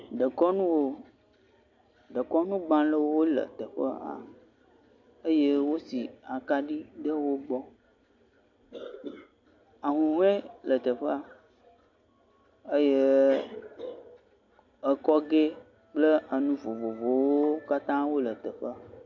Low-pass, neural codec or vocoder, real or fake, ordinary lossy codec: 7.2 kHz; none; real; MP3, 48 kbps